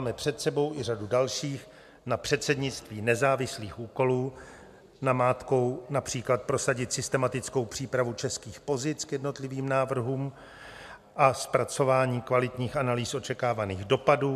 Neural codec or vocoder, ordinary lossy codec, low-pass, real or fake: none; MP3, 96 kbps; 14.4 kHz; real